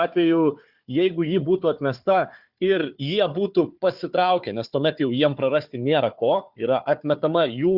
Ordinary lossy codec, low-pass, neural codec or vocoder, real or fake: Opus, 64 kbps; 5.4 kHz; codec, 16 kHz, 4 kbps, FunCodec, trained on Chinese and English, 50 frames a second; fake